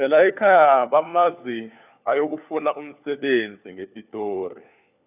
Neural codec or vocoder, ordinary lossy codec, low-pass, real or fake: codec, 16 kHz, 4 kbps, FunCodec, trained on LibriTTS, 50 frames a second; none; 3.6 kHz; fake